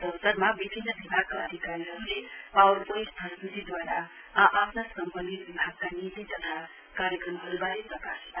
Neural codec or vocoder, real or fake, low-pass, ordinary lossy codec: none; real; 3.6 kHz; none